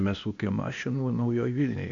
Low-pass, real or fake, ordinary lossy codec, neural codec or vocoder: 7.2 kHz; fake; AAC, 48 kbps; codec, 16 kHz, 0.8 kbps, ZipCodec